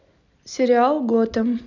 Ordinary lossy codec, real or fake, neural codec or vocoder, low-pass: none; real; none; 7.2 kHz